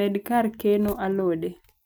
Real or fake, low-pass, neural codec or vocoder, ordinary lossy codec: real; none; none; none